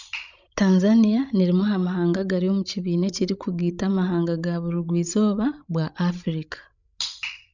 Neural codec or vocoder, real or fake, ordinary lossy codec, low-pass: codec, 16 kHz, 16 kbps, FreqCodec, larger model; fake; none; 7.2 kHz